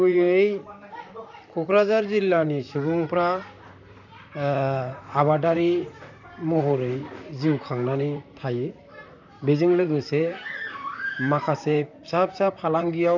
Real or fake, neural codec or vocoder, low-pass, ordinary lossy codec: fake; vocoder, 44.1 kHz, 128 mel bands, Pupu-Vocoder; 7.2 kHz; none